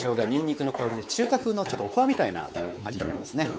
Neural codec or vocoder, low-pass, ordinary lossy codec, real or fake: codec, 16 kHz, 4 kbps, X-Codec, WavLM features, trained on Multilingual LibriSpeech; none; none; fake